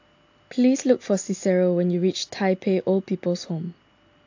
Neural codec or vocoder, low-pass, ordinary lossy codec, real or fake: none; 7.2 kHz; AAC, 48 kbps; real